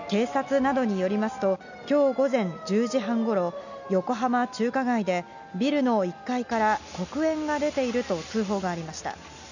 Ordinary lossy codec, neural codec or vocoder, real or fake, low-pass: none; none; real; 7.2 kHz